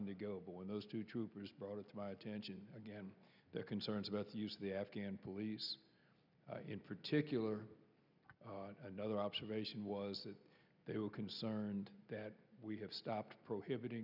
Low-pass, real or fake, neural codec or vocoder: 5.4 kHz; real; none